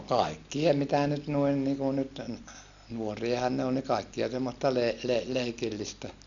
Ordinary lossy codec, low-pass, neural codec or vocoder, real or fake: none; 7.2 kHz; none; real